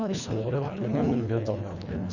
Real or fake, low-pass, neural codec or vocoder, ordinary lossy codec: fake; 7.2 kHz; codec, 24 kHz, 1.5 kbps, HILCodec; none